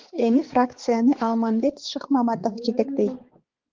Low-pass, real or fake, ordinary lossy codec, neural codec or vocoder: 7.2 kHz; fake; Opus, 16 kbps; codec, 16 kHz, 4 kbps, X-Codec, HuBERT features, trained on balanced general audio